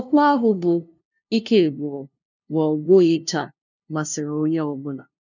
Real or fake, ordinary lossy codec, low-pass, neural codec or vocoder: fake; none; 7.2 kHz; codec, 16 kHz, 0.5 kbps, FunCodec, trained on LibriTTS, 25 frames a second